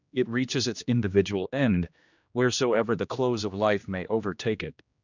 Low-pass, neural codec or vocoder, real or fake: 7.2 kHz; codec, 16 kHz, 1 kbps, X-Codec, HuBERT features, trained on general audio; fake